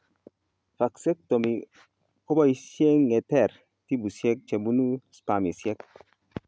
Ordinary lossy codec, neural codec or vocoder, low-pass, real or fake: none; none; none; real